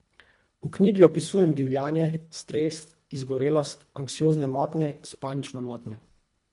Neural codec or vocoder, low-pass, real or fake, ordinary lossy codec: codec, 24 kHz, 1.5 kbps, HILCodec; 10.8 kHz; fake; MP3, 64 kbps